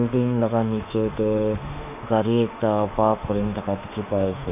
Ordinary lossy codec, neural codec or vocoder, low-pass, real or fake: none; autoencoder, 48 kHz, 32 numbers a frame, DAC-VAE, trained on Japanese speech; 3.6 kHz; fake